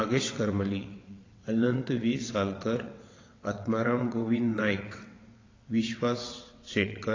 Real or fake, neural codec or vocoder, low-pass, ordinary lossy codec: fake; vocoder, 22.05 kHz, 80 mel bands, WaveNeXt; 7.2 kHz; AAC, 32 kbps